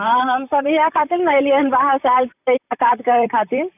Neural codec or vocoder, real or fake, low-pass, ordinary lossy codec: vocoder, 44.1 kHz, 128 mel bands, Pupu-Vocoder; fake; 3.6 kHz; none